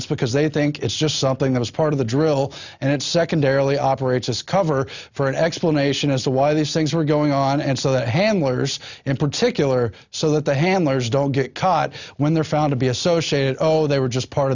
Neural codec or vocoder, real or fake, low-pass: none; real; 7.2 kHz